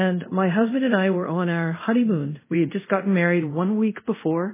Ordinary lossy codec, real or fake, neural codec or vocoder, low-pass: MP3, 16 kbps; fake; codec, 24 kHz, 0.5 kbps, DualCodec; 3.6 kHz